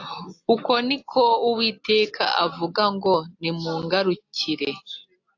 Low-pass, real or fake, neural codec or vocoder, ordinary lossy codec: 7.2 kHz; real; none; AAC, 48 kbps